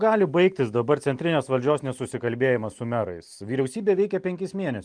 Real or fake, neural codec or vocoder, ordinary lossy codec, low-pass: real; none; Opus, 32 kbps; 9.9 kHz